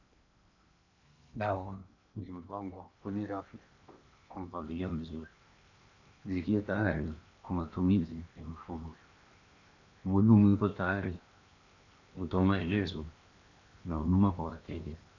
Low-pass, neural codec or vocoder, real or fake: 7.2 kHz; codec, 16 kHz in and 24 kHz out, 0.8 kbps, FocalCodec, streaming, 65536 codes; fake